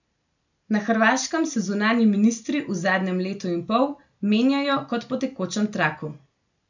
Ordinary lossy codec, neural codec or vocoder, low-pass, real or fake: none; none; 7.2 kHz; real